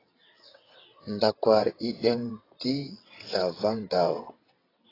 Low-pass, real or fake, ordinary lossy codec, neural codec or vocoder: 5.4 kHz; fake; AAC, 24 kbps; vocoder, 22.05 kHz, 80 mel bands, WaveNeXt